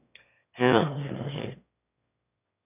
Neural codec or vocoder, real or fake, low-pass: autoencoder, 22.05 kHz, a latent of 192 numbers a frame, VITS, trained on one speaker; fake; 3.6 kHz